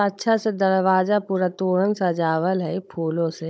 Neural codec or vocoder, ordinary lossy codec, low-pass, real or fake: codec, 16 kHz, 16 kbps, FunCodec, trained on Chinese and English, 50 frames a second; none; none; fake